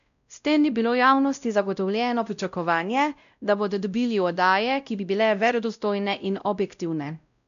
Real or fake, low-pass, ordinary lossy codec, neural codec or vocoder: fake; 7.2 kHz; none; codec, 16 kHz, 0.5 kbps, X-Codec, WavLM features, trained on Multilingual LibriSpeech